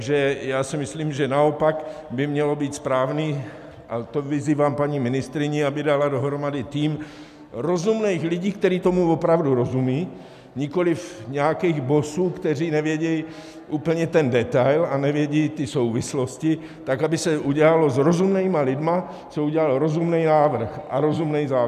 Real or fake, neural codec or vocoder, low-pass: real; none; 14.4 kHz